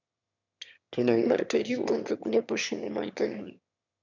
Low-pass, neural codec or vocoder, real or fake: 7.2 kHz; autoencoder, 22.05 kHz, a latent of 192 numbers a frame, VITS, trained on one speaker; fake